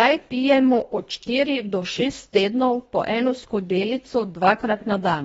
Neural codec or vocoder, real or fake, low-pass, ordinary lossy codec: codec, 24 kHz, 1.5 kbps, HILCodec; fake; 10.8 kHz; AAC, 24 kbps